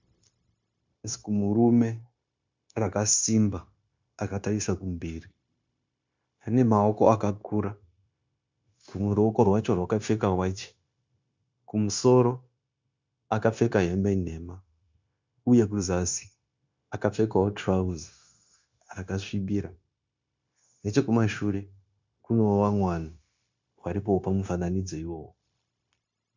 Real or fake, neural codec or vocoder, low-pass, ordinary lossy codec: fake; codec, 16 kHz, 0.9 kbps, LongCat-Audio-Codec; 7.2 kHz; MP3, 64 kbps